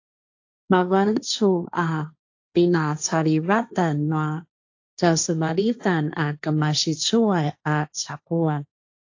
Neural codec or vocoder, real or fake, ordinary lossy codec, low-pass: codec, 16 kHz, 1.1 kbps, Voila-Tokenizer; fake; AAC, 48 kbps; 7.2 kHz